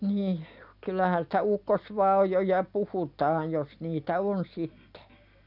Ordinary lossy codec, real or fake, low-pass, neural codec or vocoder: none; real; 5.4 kHz; none